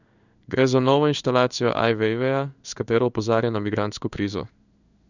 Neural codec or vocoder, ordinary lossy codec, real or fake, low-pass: codec, 16 kHz in and 24 kHz out, 1 kbps, XY-Tokenizer; none; fake; 7.2 kHz